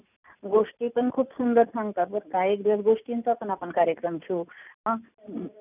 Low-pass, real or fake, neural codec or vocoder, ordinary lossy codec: 3.6 kHz; real; none; none